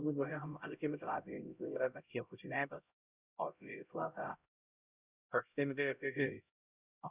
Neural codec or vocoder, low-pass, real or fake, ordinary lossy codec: codec, 16 kHz, 0.5 kbps, X-Codec, HuBERT features, trained on LibriSpeech; 3.6 kHz; fake; Opus, 64 kbps